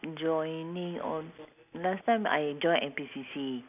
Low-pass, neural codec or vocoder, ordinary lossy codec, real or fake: 3.6 kHz; none; none; real